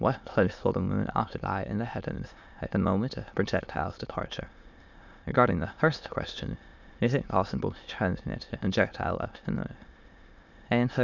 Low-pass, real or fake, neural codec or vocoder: 7.2 kHz; fake; autoencoder, 22.05 kHz, a latent of 192 numbers a frame, VITS, trained on many speakers